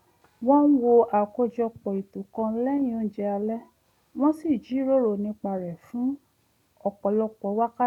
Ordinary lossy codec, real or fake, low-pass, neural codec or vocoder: none; real; 19.8 kHz; none